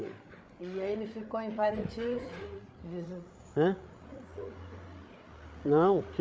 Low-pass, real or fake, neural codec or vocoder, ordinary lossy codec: none; fake; codec, 16 kHz, 8 kbps, FreqCodec, larger model; none